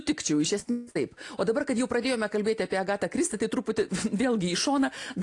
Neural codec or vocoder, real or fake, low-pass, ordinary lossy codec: none; real; 10.8 kHz; AAC, 48 kbps